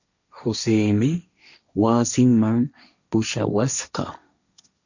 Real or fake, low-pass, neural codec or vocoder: fake; 7.2 kHz; codec, 16 kHz, 1.1 kbps, Voila-Tokenizer